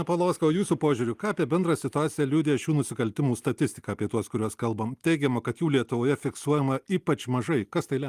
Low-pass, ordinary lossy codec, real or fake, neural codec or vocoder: 14.4 kHz; Opus, 32 kbps; real; none